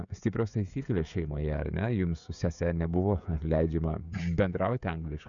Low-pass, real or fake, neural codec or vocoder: 7.2 kHz; fake; codec, 16 kHz, 16 kbps, FreqCodec, smaller model